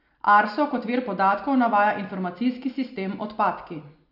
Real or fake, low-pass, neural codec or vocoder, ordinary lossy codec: real; 5.4 kHz; none; none